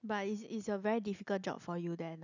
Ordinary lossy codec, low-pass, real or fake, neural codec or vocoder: none; 7.2 kHz; real; none